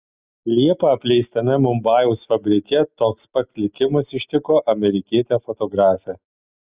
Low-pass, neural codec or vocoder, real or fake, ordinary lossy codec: 3.6 kHz; none; real; Opus, 64 kbps